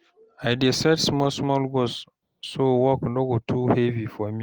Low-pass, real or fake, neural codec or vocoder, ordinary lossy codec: 19.8 kHz; real; none; Opus, 24 kbps